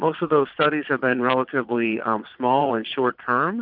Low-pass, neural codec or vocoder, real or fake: 5.4 kHz; vocoder, 22.05 kHz, 80 mel bands, Vocos; fake